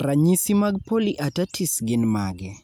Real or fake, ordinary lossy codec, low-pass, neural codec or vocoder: real; none; none; none